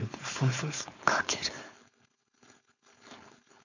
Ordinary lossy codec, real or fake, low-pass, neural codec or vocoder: none; fake; 7.2 kHz; codec, 16 kHz, 4.8 kbps, FACodec